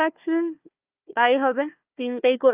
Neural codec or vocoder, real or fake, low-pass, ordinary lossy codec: codec, 16 kHz, 1 kbps, FunCodec, trained on Chinese and English, 50 frames a second; fake; 3.6 kHz; Opus, 24 kbps